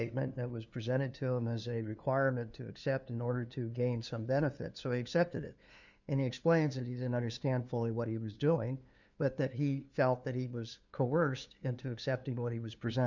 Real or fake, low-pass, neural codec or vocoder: fake; 7.2 kHz; codec, 16 kHz, 2 kbps, FunCodec, trained on LibriTTS, 25 frames a second